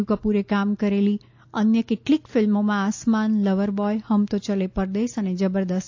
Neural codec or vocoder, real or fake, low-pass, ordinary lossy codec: none; real; 7.2 kHz; AAC, 48 kbps